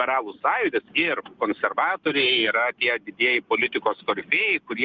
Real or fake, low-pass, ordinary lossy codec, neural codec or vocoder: real; 7.2 kHz; Opus, 24 kbps; none